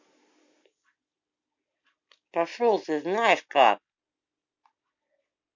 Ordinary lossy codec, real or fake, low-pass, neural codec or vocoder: MP3, 48 kbps; real; 7.2 kHz; none